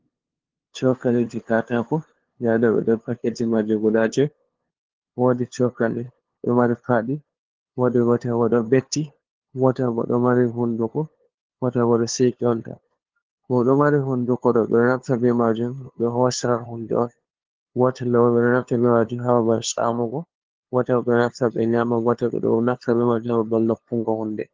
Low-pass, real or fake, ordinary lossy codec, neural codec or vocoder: 7.2 kHz; fake; Opus, 16 kbps; codec, 16 kHz, 2 kbps, FunCodec, trained on LibriTTS, 25 frames a second